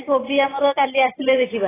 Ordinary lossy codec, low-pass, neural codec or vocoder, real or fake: AAC, 16 kbps; 3.6 kHz; none; real